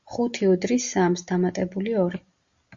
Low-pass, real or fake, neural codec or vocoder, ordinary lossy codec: 7.2 kHz; real; none; Opus, 64 kbps